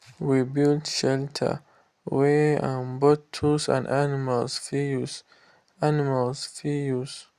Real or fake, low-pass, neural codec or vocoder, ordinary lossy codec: real; 14.4 kHz; none; Opus, 64 kbps